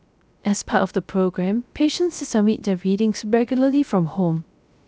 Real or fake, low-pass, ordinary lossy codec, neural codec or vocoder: fake; none; none; codec, 16 kHz, 0.3 kbps, FocalCodec